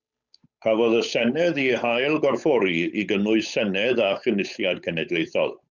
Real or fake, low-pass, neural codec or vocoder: fake; 7.2 kHz; codec, 16 kHz, 8 kbps, FunCodec, trained on Chinese and English, 25 frames a second